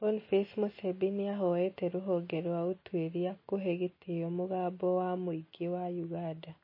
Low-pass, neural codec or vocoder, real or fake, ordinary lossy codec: 5.4 kHz; none; real; MP3, 24 kbps